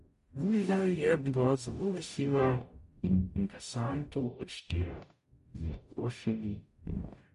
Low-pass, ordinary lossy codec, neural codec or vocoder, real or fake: 14.4 kHz; MP3, 48 kbps; codec, 44.1 kHz, 0.9 kbps, DAC; fake